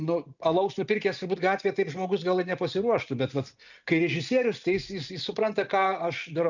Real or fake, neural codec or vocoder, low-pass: real; none; 7.2 kHz